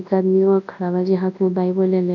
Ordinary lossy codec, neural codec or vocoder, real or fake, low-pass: none; codec, 24 kHz, 0.9 kbps, WavTokenizer, large speech release; fake; 7.2 kHz